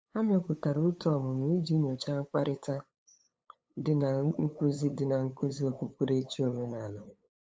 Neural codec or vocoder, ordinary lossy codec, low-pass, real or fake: codec, 16 kHz, 8 kbps, FunCodec, trained on LibriTTS, 25 frames a second; none; none; fake